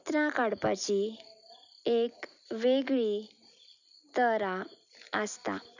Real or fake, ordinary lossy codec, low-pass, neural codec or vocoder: real; none; 7.2 kHz; none